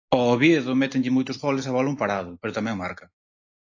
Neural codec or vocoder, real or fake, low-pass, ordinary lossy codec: none; real; 7.2 kHz; AAC, 48 kbps